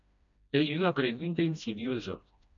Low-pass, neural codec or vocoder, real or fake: 7.2 kHz; codec, 16 kHz, 1 kbps, FreqCodec, smaller model; fake